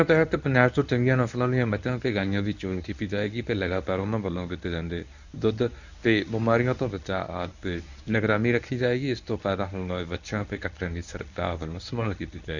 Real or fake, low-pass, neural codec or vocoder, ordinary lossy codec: fake; 7.2 kHz; codec, 24 kHz, 0.9 kbps, WavTokenizer, medium speech release version 1; none